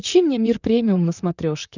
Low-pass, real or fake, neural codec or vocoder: 7.2 kHz; fake; vocoder, 44.1 kHz, 128 mel bands, Pupu-Vocoder